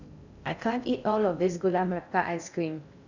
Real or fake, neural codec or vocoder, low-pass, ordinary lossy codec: fake; codec, 16 kHz in and 24 kHz out, 0.6 kbps, FocalCodec, streaming, 2048 codes; 7.2 kHz; none